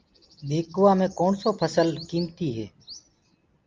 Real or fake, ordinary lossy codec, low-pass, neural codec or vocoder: real; Opus, 24 kbps; 7.2 kHz; none